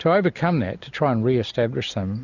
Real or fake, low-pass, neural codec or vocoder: real; 7.2 kHz; none